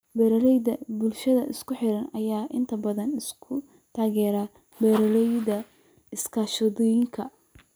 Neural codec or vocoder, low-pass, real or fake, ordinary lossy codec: none; none; real; none